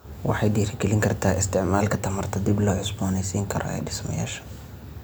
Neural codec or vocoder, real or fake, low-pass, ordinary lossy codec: none; real; none; none